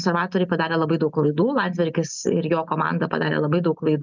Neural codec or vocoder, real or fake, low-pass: none; real; 7.2 kHz